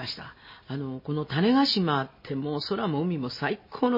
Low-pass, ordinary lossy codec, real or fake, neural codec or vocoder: 5.4 kHz; MP3, 24 kbps; real; none